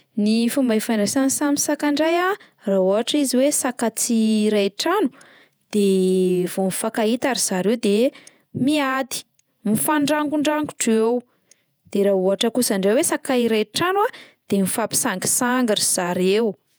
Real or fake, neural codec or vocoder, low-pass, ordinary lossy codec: fake; vocoder, 48 kHz, 128 mel bands, Vocos; none; none